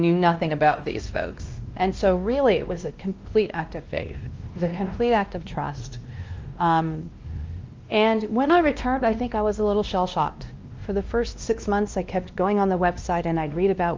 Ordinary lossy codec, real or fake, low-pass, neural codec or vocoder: Opus, 24 kbps; fake; 7.2 kHz; codec, 16 kHz, 1 kbps, X-Codec, WavLM features, trained on Multilingual LibriSpeech